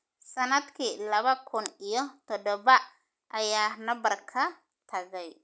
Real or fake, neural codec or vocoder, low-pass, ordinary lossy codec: real; none; none; none